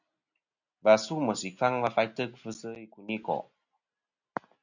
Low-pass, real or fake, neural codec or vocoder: 7.2 kHz; real; none